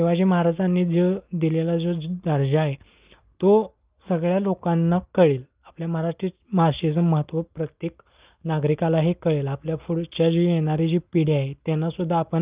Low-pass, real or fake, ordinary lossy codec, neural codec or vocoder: 3.6 kHz; real; Opus, 32 kbps; none